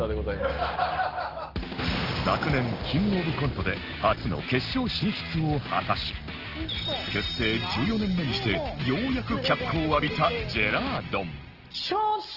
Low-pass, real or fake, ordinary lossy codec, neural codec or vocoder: 5.4 kHz; real; Opus, 16 kbps; none